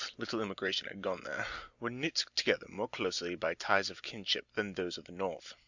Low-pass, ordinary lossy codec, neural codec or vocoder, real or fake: 7.2 kHz; Opus, 64 kbps; none; real